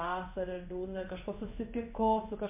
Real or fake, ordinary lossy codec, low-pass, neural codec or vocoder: fake; MP3, 32 kbps; 3.6 kHz; codec, 16 kHz in and 24 kHz out, 1 kbps, XY-Tokenizer